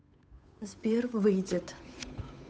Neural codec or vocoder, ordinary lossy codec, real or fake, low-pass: codec, 16 kHz, 8 kbps, FunCodec, trained on Chinese and English, 25 frames a second; none; fake; none